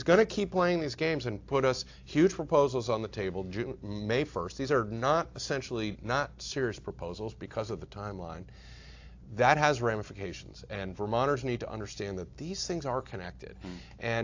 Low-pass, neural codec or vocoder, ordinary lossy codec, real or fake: 7.2 kHz; none; AAC, 48 kbps; real